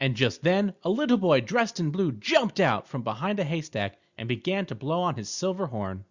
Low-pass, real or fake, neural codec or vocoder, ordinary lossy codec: 7.2 kHz; real; none; Opus, 64 kbps